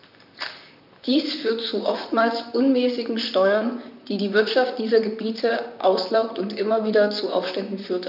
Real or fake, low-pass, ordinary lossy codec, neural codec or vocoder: fake; 5.4 kHz; none; vocoder, 44.1 kHz, 128 mel bands, Pupu-Vocoder